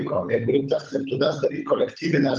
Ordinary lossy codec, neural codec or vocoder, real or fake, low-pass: Opus, 24 kbps; codec, 16 kHz, 16 kbps, FunCodec, trained on LibriTTS, 50 frames a second; fake; 7.2 kHz